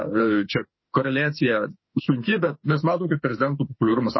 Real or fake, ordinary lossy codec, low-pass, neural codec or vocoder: fake; MP3, 24 kbps; 7.2 kHz; autoencoder, 48 kHz, 32 numbers a frame, DAC-VAE, trained on Japanese speech